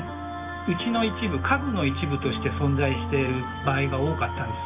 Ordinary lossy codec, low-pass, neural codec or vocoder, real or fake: none; 3.6 kHz; none; real